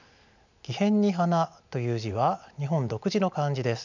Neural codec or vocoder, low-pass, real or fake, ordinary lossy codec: none; 7.2 kHz; real; none